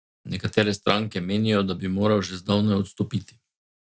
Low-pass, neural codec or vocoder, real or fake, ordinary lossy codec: none; none; real; none